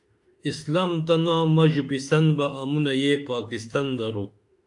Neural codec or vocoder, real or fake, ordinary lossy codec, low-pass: autoencoder, 48 kHz, 32 numbers a frame, DAC-VAE, trained on Japanese speech; fake; AAC, 64 kbps; 10.8 kHz